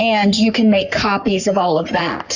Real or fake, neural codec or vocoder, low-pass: fake; codec, 44.1 kHz, 3.4 kbps, Pupu-Codec; 7.2 kHz